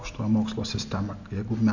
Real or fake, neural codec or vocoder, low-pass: real; none; 7.2 kHz